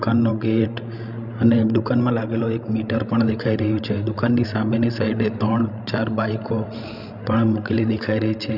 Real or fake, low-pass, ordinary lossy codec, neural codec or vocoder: fake; 5.4 kHz; none; codec, 16 kHz, 16 kbps, FreqCodec, larger model